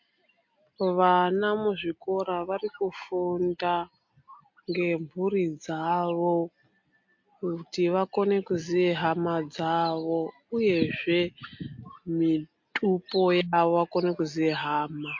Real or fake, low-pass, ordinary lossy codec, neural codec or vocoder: real; 7.2 kHz; MP3, 48 kbps; none